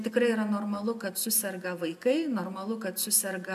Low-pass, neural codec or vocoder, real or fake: 14.4 kHz; none; real